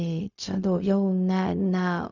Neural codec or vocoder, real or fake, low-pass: codec, 16 kHz, 0.4 kbps, LongCat-Audio-Codec; fake; 7.2 kHz